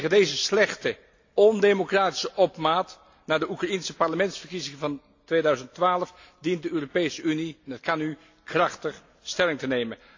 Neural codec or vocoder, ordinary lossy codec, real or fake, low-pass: none; AAC, 48 kbps; real; 7.2 kHz